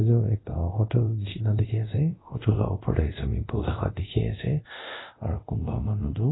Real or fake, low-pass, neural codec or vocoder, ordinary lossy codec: fake; 7.2 kHz; codec, 24 kHz, 0.5 kbps, DualCodec; AAC, 16 kbps